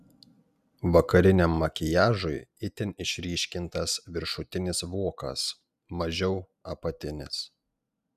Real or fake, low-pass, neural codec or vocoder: real; 14.4 kHz; none